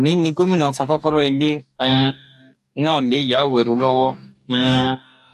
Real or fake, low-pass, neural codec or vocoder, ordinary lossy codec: fake; 14.4 kHz; codec, 44.1 kHz, 2.6 kbps, DAC; AAC, 96 kbps